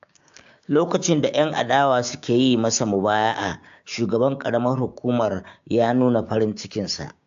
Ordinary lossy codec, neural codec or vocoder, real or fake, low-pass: AAC, 64 kbps; codec, 16 kHz, 6 kbps, DAC; fake; 7.2 kHz